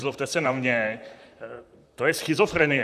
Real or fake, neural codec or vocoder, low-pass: fake; vocoder, 44.1 kHz, 128 mel bands, Pupu-Vocoder; 14.4 kHz